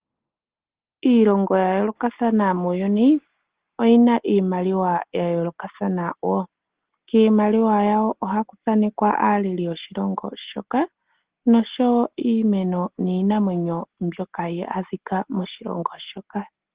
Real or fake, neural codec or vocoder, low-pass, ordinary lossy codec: real; none; 3.6 kHz; Opus, 16 kbps